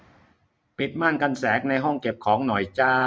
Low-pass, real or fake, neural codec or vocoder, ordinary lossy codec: none; real; none; none